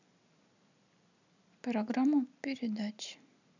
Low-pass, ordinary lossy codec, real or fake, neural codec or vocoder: 7.2 kHz; none; real; none